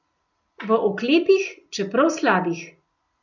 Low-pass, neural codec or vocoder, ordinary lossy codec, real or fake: 7.2 kHz; none; none; real